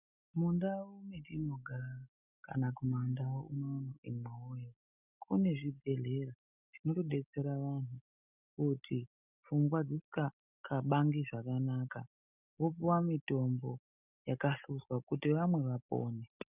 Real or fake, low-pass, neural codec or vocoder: real; 3.6 kHz; none